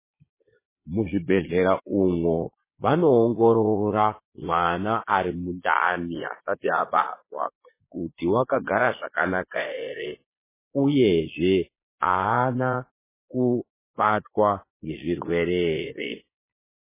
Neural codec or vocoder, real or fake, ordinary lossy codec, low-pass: vocoder, 22.05 kHz, 80 mel bands, Vocos; fake; MP3, 16 kbps; 3.6 kHz